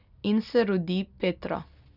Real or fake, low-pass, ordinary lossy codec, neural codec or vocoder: real; 5.4 kHz; none; none